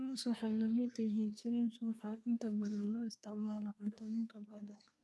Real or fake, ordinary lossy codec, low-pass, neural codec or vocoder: fake; none; none; codec, 24 kHz, 1 kbps, SNAC